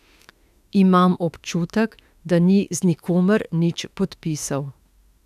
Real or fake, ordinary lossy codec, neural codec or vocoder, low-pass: fake; none; autoencoder, 48 kHz, 32 numbers a frame, DAC-VAE, trained on Japanese speech; 14.4 kHz